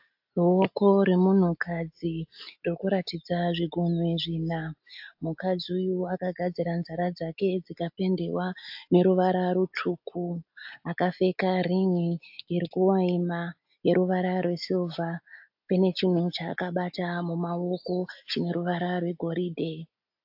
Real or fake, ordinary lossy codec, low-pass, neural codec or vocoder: real; AAC, 48 kbps; 5.4 kHz; none